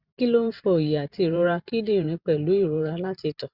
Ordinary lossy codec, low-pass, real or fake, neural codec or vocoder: Opus, 64 kbps; 5.4 kHz; fake; vocoder, 44.1 kHz, 128 mel bands every 512 samples, BigVGAN v2